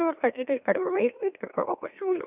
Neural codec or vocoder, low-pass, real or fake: autoencoder, 44.1 kHz, a latent of 192 numbers a frame, MeloTTS; 3.6 kHz; fake